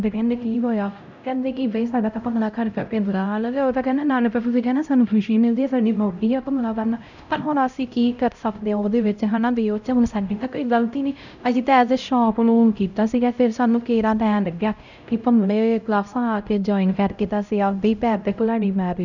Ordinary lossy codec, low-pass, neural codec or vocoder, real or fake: none; 7.2 kHz; codec, 16 kHz, 0.5 kbps, X-Codec, HuBERT features, trained on LibriSpeech; fake